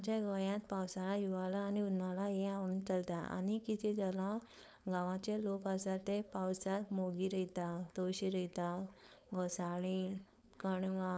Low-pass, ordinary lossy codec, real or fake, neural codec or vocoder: none; none; fake; codec, 16 kHz, 4.8 kbps, FACodec